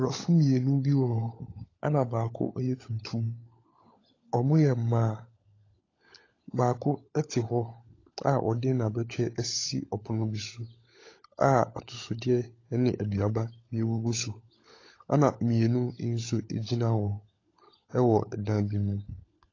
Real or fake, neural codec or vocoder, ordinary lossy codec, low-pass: fake; codec, 16 kHz, 16 kbps, FunCodec, trained on LibriTTS, 50 frames a second; AAC, 32 kbps; 7.2 kHz